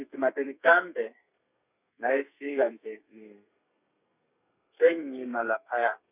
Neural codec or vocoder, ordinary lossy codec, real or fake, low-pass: codec, 32 kHz, 1.9 kbps, SNAC; none; fake; 3.6 kHz